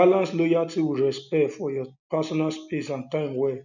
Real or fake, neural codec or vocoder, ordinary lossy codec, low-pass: real; none; none; 7.2 kHz